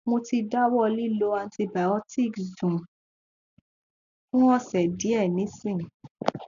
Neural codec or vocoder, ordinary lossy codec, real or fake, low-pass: none; none; real; 7.2 kHz